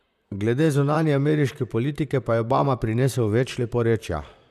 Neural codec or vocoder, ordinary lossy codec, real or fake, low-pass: vocoder, 44.1 kHz, 128 mel bands, Pupu-Vocoder; none; fake; 14.4 kHz